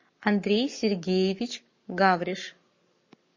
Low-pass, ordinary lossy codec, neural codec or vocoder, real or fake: 7.2 kHz; MP3, 32 kbps; codec, 44.1 kHz, 7.8 kbps, Pupu-Codec; fake